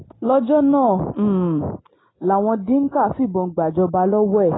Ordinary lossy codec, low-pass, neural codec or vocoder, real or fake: AAC, 16 kbps; 7.2 kHz; none; real